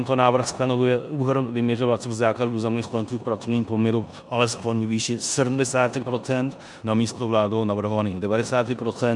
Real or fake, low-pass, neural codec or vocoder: fake; 10.8 kHz; codec, 16 kHz in and 24 kHz out, 0.9 kbps, LongCat-Audio-Codec, four codebook decoder